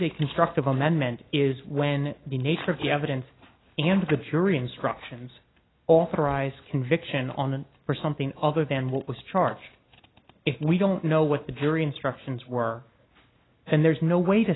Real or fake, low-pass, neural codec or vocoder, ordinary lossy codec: real; 7.2 kHz; none; AAC, 16 kbps